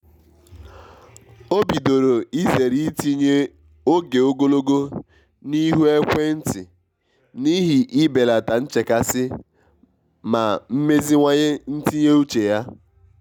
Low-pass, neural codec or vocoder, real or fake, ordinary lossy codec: 19.8 kHz; none; real; none